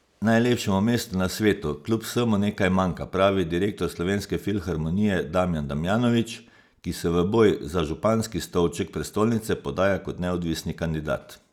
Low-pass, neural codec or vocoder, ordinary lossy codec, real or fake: 19.8 kHz; none; none; real